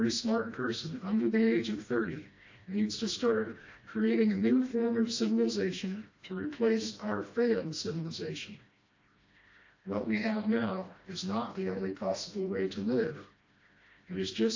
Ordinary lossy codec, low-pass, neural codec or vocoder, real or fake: AAC, 48 kbps; 7.2 kHz; codec, 16 kHz, 1 kbps, FreqCodec, smaller model; fake